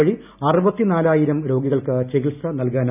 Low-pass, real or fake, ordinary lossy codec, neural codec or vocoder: 3.6 kHz; real; none; none